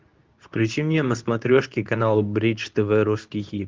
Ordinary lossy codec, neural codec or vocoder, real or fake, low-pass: Opus, 24 kbps; codec, 24 kHz, 0.9 kbps, WavTokenizer, medium speech release version 2; fake; 7.2 kHz